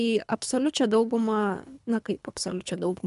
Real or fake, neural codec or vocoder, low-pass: fake; codec, 24 kHz, 3 kbps, HILCodec; 10.8 kHz